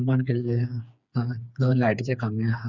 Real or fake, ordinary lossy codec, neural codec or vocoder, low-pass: fake; none; codec, 16 kHz, 4 kbps, FreqCodec, smaller model; 7.2 kHz